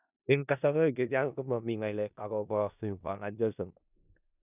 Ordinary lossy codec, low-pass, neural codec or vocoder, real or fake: none; 3.6 kHz; codec, 16 kHz in and 24 kHz out, 0.4 kbps, LongCat-Audio-Codec, four codebook decoder; fake